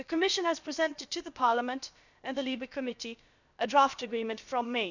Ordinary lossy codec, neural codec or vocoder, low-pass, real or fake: none; codec, 16 kHz, about 1 kbps, DyCAST, with the encoder's durations; 7.2 kHz; fake